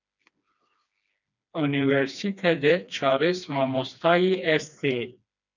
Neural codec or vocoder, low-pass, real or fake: codec, 16 kHz, 2 kbps, FreqCodec, smaller model; 7.2 kHz; fake